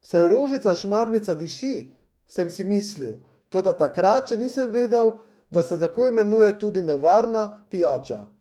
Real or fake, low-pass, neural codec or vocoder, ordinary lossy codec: fake; 19.8 kHz; codec, 44.1 kHz, 2.6 kbps, DAC; none